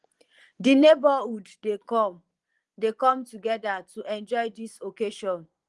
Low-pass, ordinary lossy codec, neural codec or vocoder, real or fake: 10.8 kHz; Opus, 24 kbps; vocoder, 24 kHz, 100 mel bands, Vocos; fake